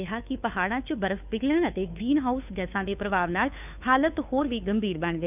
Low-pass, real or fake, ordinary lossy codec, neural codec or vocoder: 3.6 kHz; fake; none; codec, 16 kHz, 2 kbps, FunCodec, trained on Chinese and English, 25 frames a second